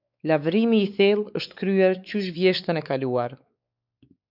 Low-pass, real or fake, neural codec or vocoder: 5.4 kHz; fake; codec, 16 kHz, 4 kbps, X-Codec, WavLM features, trained on Multilingual LibriSpeech